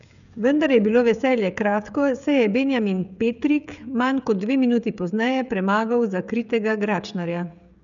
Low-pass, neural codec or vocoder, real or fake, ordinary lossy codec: 7.2 kHz; codec, 16 kHz, 16 kbps, FreqCodec, smaller model; fake; none